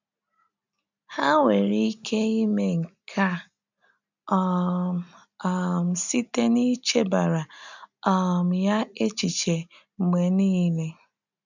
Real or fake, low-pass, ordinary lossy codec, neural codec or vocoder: real; 7.2 kHz; none; none